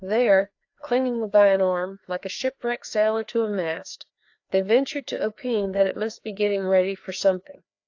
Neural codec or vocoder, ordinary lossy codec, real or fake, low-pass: codec, 16 kHz, 2 kbps, FreqCodec, larger model; AAC, 48 kbps; fake; 7.2 kHz